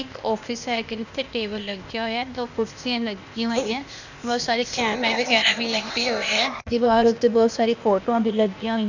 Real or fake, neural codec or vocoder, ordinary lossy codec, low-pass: fake; codec, 16 kHz, 0.8 kbps, ZipCodec; none; 7.2 kHz